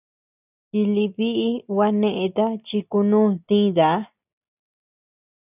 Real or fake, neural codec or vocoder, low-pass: real; none; 3.6 kHz